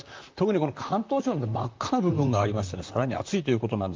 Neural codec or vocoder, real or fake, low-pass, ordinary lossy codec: vocoder, 44.1 kHz, 128 mel bands, Pupu-Vocoder; fake; 7.2 kHz; Opus, 24 kbps